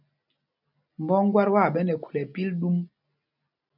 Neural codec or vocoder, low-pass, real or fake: none; 5.4 kHz; real